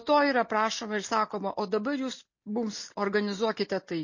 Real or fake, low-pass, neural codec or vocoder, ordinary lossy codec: real; 7.2 kHz; none; MP3, 32 kbps